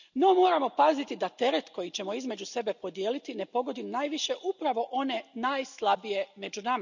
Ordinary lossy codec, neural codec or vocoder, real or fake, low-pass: none; none; real; 7.2 kHz